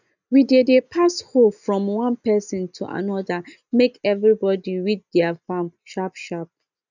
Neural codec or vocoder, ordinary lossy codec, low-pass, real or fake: none; none; 7.2 kHz; real